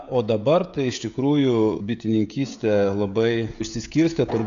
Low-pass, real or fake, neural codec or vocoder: 7.2 kHz; fake; codec, 16 kHz, 16 kbps, FreqCodec, smaller model